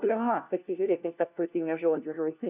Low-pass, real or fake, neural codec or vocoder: 3.6 kHz; fake; codec, 16 kHz, 1 kbps, FunCodec, trained on LibriTTS, 50 frames a second